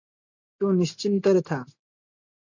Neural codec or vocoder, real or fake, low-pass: none; real; 7.2 kHz